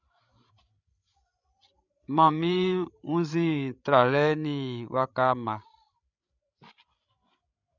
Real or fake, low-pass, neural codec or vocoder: fake; 7.2 kHz; codec, 16 kHz, 16 kbps, FreqCodec, larger model